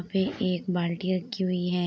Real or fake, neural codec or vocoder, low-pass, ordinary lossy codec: real; none; none; none